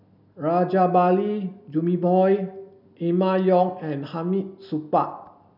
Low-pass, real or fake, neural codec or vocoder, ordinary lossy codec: 5.4 kHz; real; none; none